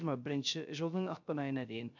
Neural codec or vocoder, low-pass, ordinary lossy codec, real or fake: codec, 16 kHz, 0.3 kbps, FocalCodec; 7.2 kHz; none; fake